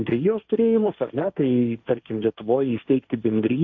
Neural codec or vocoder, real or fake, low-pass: codec, 16 kHz, 1.1 kbps, Voila-Tokenizer; fake; 7.2 kHz